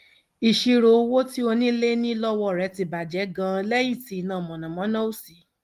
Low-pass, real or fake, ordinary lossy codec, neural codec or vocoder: 14.4 kHz; real; Opus, 24 kbps; none